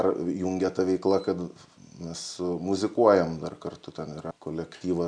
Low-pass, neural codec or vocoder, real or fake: 9.9 kHz; none; real